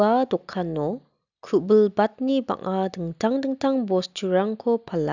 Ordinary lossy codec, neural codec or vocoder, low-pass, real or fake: none; none; 7.2 kHz; real